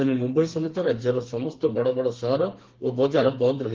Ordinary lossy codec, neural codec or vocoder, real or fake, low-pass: Opus, 16 kbps; codec, 32 kHz, 1.9 kbps, SNAC; fake; 7.2 kHz